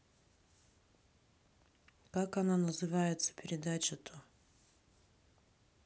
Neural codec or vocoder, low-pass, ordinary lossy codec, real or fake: none; none; none; real